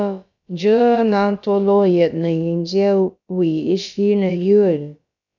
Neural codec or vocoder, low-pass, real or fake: codec, 16 kHz, about 1 kbps, DyCAST, with the encoder's durations; 7.2 kHz; fake